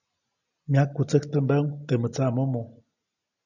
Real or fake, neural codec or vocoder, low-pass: real; none; 7.2 kHz